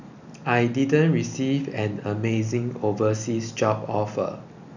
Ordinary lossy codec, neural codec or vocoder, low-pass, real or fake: none; none; 7.2 kHz; real